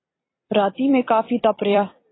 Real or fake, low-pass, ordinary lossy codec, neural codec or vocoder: fake; 7.2 kHz; AAC, 16 kbps; vocoder, 44.1 kHz, 128 mel bands every 256 samples, BigVGAN v2